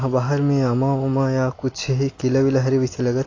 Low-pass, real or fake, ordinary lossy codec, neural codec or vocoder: 7.2 kHz; real; AAC, 32 kbps; none